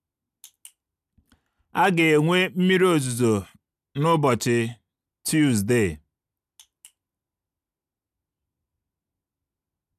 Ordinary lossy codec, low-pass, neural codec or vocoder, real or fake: none; 14.4 kHz; none; real